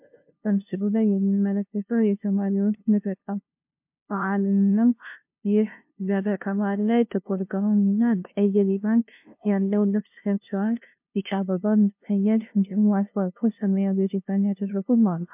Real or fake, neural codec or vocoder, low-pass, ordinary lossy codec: fake; codec, 16 kHz, 0.5 kbps, FunCodec, trained on LibriTTS, 25 frames a second; 3.6 kHz; MP3, 32 kbps